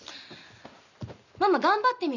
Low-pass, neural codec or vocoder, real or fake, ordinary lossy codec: 7.2 kHz; none; real; none